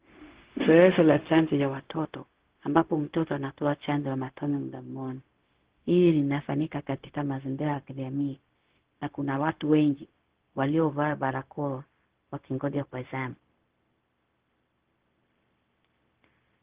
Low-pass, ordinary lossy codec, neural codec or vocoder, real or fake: 3.6 kHz; Opus, 16 kbps; codec, 16 kHz, 0.4 kbps, LongCat-Audio-Codec; fake